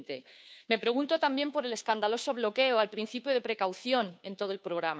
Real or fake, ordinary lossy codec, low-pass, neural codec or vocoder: fake; none; none; codec, 16 kHz, 2 kbps, FunCodec, trained on Chinese and English, 25 frames a second